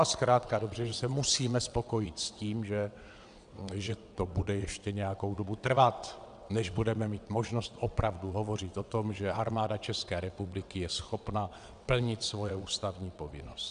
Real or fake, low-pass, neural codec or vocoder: fake; 9.9 kHz; vocoder, 22.05 kHz, 80 mel bands, WaveNeXt